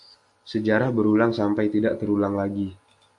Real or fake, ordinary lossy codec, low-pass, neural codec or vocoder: real; AAC, 64 kbps; 10.8 kHz; none